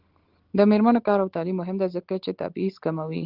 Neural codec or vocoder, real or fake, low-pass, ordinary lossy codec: none; real; 5.4 kHz; Opus, 16 kbps